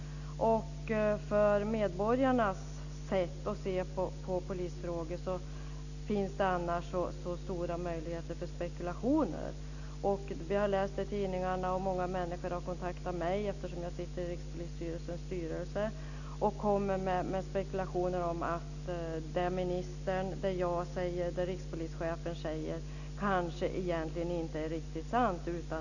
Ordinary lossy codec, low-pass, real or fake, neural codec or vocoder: none; 7.2 kHz; real; none